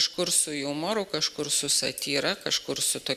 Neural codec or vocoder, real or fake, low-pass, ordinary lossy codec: none; real; 14.4 kHz; Opus, 64 kbps